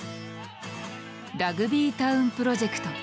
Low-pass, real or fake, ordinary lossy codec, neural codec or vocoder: none; real; none; none